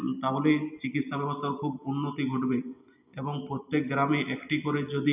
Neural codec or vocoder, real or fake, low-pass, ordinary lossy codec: none; real; 3.6 kHz; none